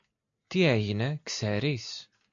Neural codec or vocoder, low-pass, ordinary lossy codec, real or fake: none; 7.2 kHz; AAC, 64 kbps; real